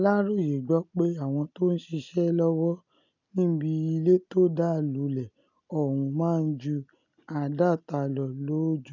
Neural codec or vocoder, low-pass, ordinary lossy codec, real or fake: none; 7.2 kHz; none; real